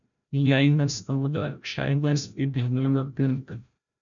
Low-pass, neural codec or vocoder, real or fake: 7.2 kHz; codec, 16 kHz, 0.5 kbps, FreqCodec, larger model; fake